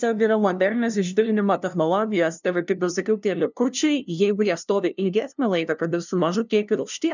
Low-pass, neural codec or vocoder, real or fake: 7.2 kHz; codec, 16 kHz, 0.5 kbps, FunCodec, trained on LibriTTS, 25 frames a second; fake